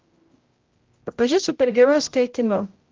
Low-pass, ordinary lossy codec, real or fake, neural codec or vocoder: 7.2 kHz; Opus, 32 kbps; fake; codec, 16 kHz, 0.5 kbps, X-Codec, HuBERT features, trained on general audio